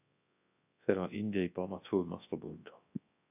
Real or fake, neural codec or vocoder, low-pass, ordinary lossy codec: fake; codec, 24 kHz, 0.9 kbps, WavTokenizer, large speech release; 3.6 kHz; AAC, 24 kbps